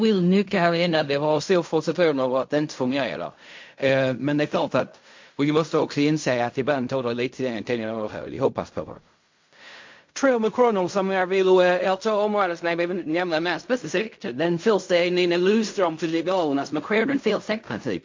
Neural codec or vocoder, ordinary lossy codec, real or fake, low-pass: codec, 16 kHz in and 24 kHz out, 0.4 kbps, LongCat-Audio-Codec, fine tuned four codebook decoder; MP3, 48 kbps; fake; 7.2 kHz